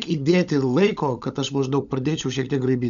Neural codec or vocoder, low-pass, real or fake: codec, 16 kHz, 16 kbps, FunCodec, trained on LibriTTS, 50 frames a second; 7.2 kHz; fake